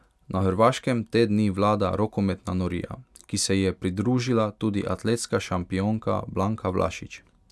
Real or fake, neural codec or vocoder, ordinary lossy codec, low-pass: real; none; none; none